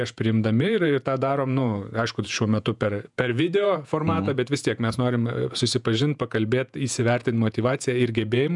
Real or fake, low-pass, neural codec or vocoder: fake; 10.8 kHz; vocoder, 48 kHz, 128 mel bands, Vocos